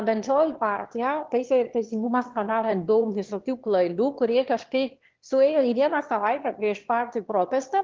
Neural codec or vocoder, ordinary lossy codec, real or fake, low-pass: autoencoder, 22.05 kHz, a latent of 192 numbers a frame, VITS, trained on one speaker; Opus, 16 kbps; fake; 7.2 kHz